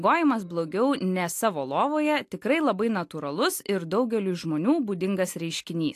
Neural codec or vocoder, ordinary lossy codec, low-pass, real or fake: none; AAC, 64 kbps; 14.4 kHz; real